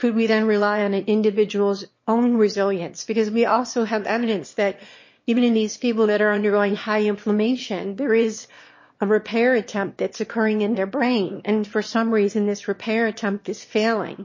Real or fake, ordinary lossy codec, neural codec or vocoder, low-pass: fake; MP3, 32 kbps; autoencoder, 22.05 kHz, a latent of 192 numbers a frame, VITS, trained on one speaker; 7.2 kHz